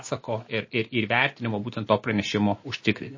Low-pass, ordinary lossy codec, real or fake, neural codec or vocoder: 7.2 kHz; MP3, 32 kbps; real; none